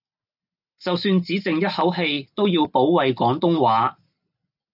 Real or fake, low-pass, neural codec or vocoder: real; 5.4 kHz; none